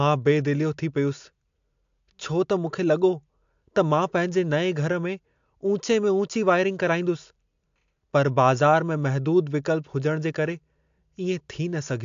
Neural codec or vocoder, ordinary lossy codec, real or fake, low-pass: none; AAC, 64 kbps; real; 7.2 kHz